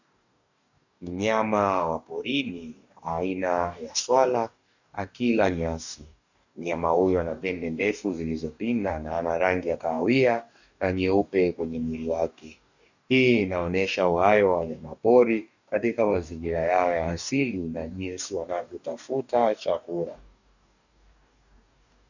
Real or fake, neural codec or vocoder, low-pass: fake; codec, 44.1 kHz, 2.6 kbps, DAC; 7.2 kHz